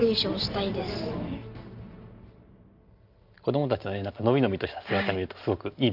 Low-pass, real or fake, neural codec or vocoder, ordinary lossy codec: 5.4 kHz; real; none; Opus, 24 kbps